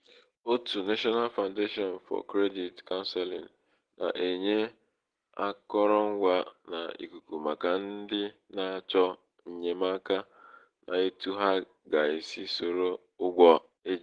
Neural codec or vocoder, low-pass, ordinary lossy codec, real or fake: none; 9.9 kHz; Opus, 16 kbps; real